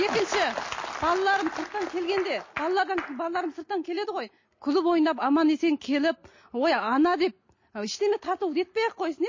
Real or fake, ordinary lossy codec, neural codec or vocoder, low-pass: real; MP3, 32 kbps; none; 7.2 kHz